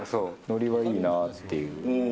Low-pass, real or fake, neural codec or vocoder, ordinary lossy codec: none; real; none; none